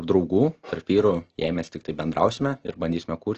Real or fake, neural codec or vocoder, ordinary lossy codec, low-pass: real; none; Opus, 16 kbps; 7.2 kHz